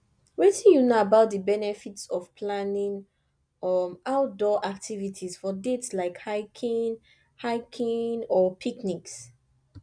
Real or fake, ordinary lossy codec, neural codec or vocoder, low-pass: real; none; none; 9.9 kHz